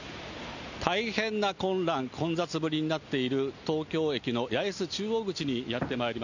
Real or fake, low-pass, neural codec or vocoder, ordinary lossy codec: real; 7.2 kHz; none; none